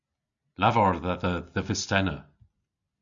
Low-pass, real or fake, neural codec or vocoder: 7.2 kHz; real; none